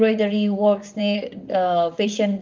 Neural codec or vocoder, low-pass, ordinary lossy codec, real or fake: vocoder, 44.1 kHz, 128 mel bands, Pupu-Vocoder; 7.2 kHz; Opus, 24 kbps; fake